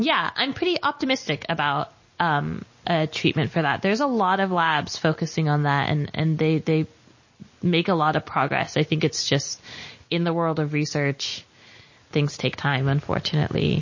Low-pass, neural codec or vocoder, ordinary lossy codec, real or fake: 7.2 kHz; none; MP3, 32 kbps; real